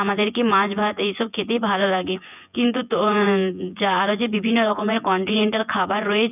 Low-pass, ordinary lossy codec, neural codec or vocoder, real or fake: 3.6 kHz; none; vocoder, 24 kHz, 100 mel bands, Vocos; fake